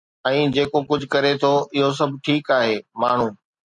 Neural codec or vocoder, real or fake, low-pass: none; real; 10.8 kHz